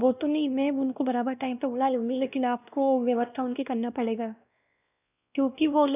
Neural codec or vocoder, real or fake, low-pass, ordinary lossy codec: codec, 16 kHz, 1 kbps, X-Codec, WavLM features, trained on Multilingual LibriSpeech; fake; 3.6 kHz; none